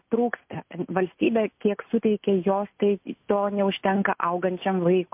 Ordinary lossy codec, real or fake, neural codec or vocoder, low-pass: MP3, 32 kbps; real; none; 3.6 kHz